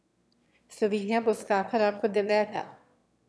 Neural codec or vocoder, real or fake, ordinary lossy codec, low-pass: autoencoder, 22.05 kHz, a latent of 192 numbers a frame, VITS, trained on one speaker; fake; none; none